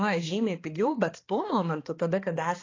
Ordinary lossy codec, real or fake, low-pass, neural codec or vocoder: AAC, 32 kbps; fake; 7.2 kHz; codec, 16 kHz, 2 kbps, X-Codec, HuBERT features, trained on balanced general audio